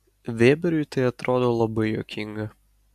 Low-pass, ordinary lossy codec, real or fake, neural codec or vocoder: 14.4 kHz; AAC, 96 kbps; real; none